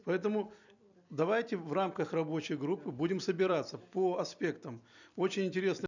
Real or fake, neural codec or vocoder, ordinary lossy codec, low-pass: fake; vocoder, 44.1 kHz, 128 mel bands every 256 samples, BigVGAN v2; none; 7.2 kHz